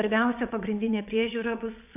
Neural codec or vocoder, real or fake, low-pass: vocoder, 22.05 kHz, 80 mel bands, WaveNeXt; fake; 3.6 kHz